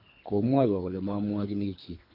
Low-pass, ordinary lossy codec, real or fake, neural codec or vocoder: 5.4 kHz; MP3, 32 kbps; fake; codec, 24 kHz, 3 kbps, HILCodec